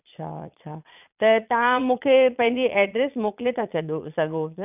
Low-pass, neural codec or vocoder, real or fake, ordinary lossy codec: 3.6 kHz; vocoder, 22.05 kHz, 80 mel bands, Vocos; fake; none